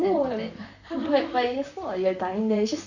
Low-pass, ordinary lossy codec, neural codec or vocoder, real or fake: 7.2 kHz; none; vocoder, 44.1 kHz, 128 mel bands, Pupu-Vocoder; fake